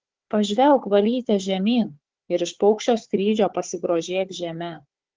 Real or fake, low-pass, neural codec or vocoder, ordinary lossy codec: fake; 7.2 kHz; codec, 16 kHz, 4 kbps, FunCodec, trained on Chinese and English, 50 frames a second; Opus, 16 kbps